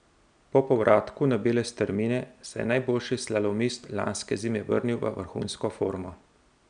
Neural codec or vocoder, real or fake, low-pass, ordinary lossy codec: none; real; 9.9 kHz; none